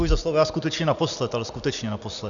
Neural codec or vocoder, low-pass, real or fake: none; 7.2 kHz; real